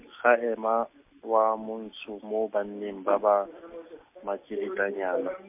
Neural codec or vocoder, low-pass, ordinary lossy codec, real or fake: none; 3.6 kHz; MP3, 32 kbps; real